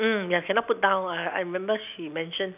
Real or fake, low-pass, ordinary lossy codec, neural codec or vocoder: real; 3.6 kHz; none; none